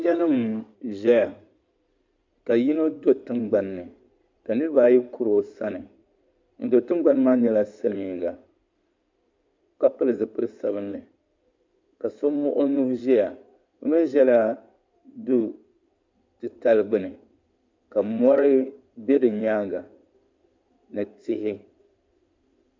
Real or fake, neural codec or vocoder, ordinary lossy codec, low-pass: fake; codec, 16 kHz in and 24 kHz out, 2.2 kbps, FireRedTTS-2 codec; MP3, 64 kbps; 7.2 kHz